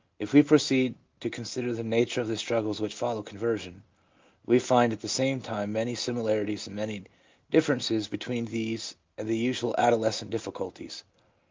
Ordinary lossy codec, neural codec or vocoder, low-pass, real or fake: Opus, 24 kbps; none; 7.2 kHz; real